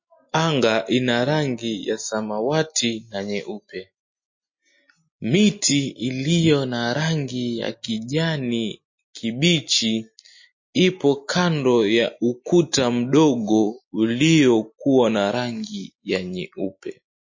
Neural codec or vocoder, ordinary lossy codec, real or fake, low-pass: none; MP3, 32 kbps; real; 7.2 kHz